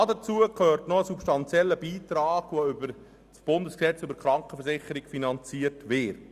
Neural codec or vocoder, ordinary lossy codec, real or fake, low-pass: none; Opus, 64 kbps; real; 14.4 kHz